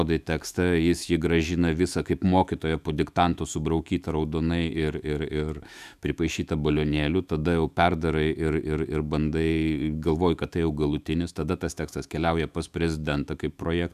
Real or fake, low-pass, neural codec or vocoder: fake; 14.4 kHz; autoencoder, 48 kHz, 128 numbers a frame, DAC-VAE, trained on Japanese speech